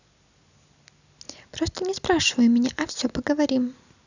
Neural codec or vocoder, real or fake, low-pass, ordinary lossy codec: none; real; 7.2 kHz; none